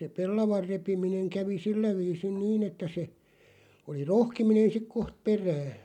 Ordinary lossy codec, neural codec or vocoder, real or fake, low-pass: none; none; real; 19.8 kHz